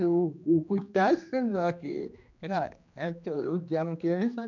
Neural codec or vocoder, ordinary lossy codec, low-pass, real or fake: codec, 16 kHz, 2 kbps, X-Codec, HuBERT features, trained on general audio; MP3, 64 kbps; 7.2 kHz; fake